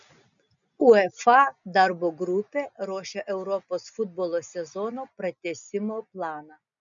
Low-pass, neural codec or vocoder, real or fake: 7.2 kHz; none; real